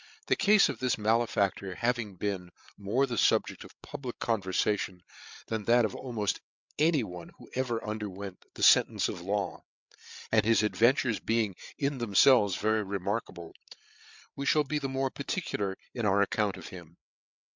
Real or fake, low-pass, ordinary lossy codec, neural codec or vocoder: fake; 7.2 kHz; MP3, 64 kbps; codec, 16 kHz, 8 kbps, FreqCodec, larger model